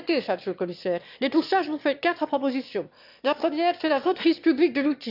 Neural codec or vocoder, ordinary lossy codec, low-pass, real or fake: autoencoder, 22.05 kHz, a latent of 192 numbers a frame, VITS, trained on one speaker; none; 5.4 kHz; fake